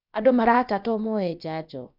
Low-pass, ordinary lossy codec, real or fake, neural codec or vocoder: 5.4 kHz; none; fake; codec, 16 kHz, about 1 kbps, DyCAST, with the encoder's durations